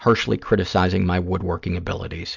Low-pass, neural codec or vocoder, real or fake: 7.2 kHz; none; real